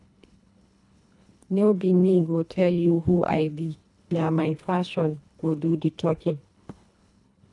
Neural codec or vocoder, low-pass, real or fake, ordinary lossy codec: codec, 24 kHz, 1.5 kbps, HILCodec; 10.8 kHz; fake; none